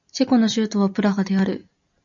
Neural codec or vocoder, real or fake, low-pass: none; real; 7.2 kHz